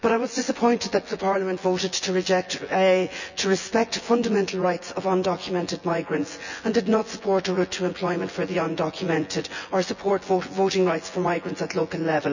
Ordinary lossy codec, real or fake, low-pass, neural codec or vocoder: none; fake; 7.2 kHz; vocoder, 24 kHz, 100 mel bands, Vocos